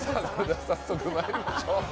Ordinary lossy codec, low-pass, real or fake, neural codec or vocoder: none; none; real; none